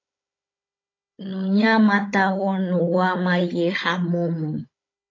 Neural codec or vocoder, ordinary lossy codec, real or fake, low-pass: codec, 16 kHz, 16 kbps, FunCodec, trained on Chinese and English, 50 frames a second; AAC, 32 kbps; fake; 7.2 kHz